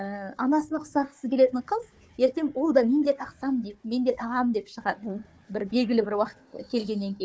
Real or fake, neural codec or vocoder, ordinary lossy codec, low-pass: fake; codec, 16 kHz, 4 kbps, FunCodec, trained on Chinese and English, 50 frames a second; none; none